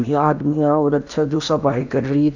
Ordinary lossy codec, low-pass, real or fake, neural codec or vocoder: none; 7.2 kHz; fake; codec, 16 kHz in and 24 kHz out, 0.8 kbps, FocalCodec, streaming, 65536 codes